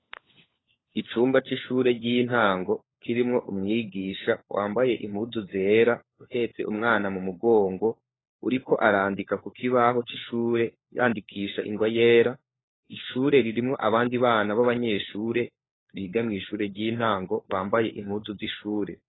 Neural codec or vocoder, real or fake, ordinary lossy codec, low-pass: codec, 16 kHz, 4 kbps, FunCodec, trained on LibriTTS, 50 frames a second; fake; AAC, 16 kbps; 7.2 kHz